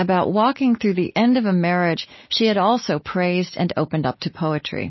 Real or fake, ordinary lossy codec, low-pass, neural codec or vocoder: real; MP3, 24 kbps; 7.2 kHz; none